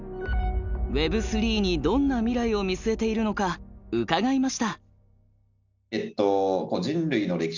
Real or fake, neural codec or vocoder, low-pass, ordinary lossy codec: real; none; 7.2 kHz; none